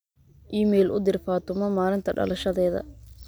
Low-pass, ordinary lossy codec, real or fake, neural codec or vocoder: none; none; real; none